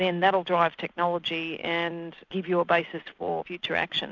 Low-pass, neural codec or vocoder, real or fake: 7.2 kHz; none; real